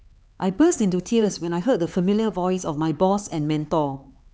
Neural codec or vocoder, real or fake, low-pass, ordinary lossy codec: codec, 16 kHz, 4 kbps, X-Codec, HuBERT features, trained on LibriSpeech; fake; none; none